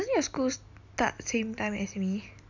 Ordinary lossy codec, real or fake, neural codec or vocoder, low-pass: none; real; none; 7.2 kHz